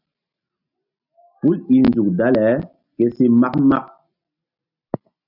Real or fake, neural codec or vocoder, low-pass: real; none; 5.4 kHz